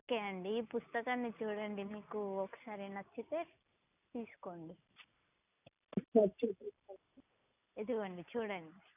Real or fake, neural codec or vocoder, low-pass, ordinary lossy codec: real; none; 3.6 kHz; none